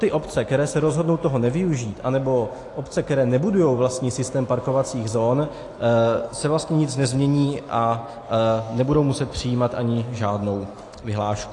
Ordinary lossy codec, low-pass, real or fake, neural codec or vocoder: AAC, 48 kbps; 10.8 kHz; real; none